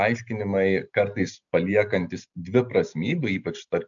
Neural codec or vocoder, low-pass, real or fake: none; 7.2 kHz; real